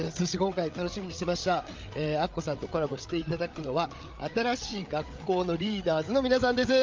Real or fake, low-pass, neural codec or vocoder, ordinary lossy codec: fake; 7.2 kHz; codec, 16 kHz, 8 kbps, FreqCodec, larger model; Opus, 24 kbps